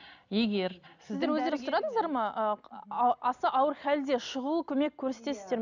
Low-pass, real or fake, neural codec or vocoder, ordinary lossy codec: 7.2 kHz; real; none; none